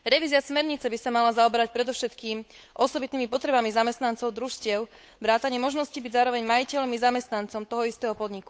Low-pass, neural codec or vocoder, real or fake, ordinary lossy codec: none; codec, 16 kHz, 8 kbps, FunCodec, trained on Chinese and English, 25 frames a second; fake; none